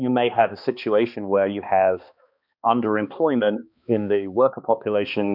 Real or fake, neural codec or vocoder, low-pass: fake; codec, 16 kHz, 2 kbps, X-Codec, HuBERT features, trained on balanced general audio; 5.4 kHz